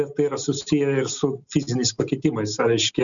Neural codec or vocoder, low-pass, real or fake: none; 7.2 kHz; real